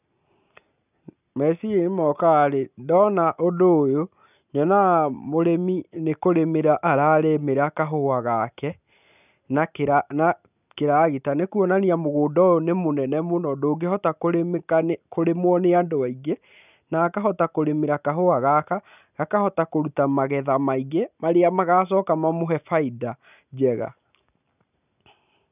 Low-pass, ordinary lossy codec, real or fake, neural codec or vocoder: 3.6 kHz; none; real; none